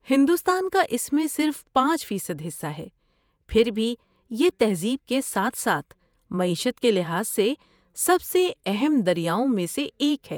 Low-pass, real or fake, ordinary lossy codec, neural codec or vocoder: none; fake; none; vocoder, 48 kHz, 128 mel bands, Vocos